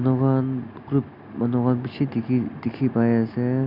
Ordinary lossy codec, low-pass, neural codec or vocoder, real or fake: none; 5.4 kHz; none; real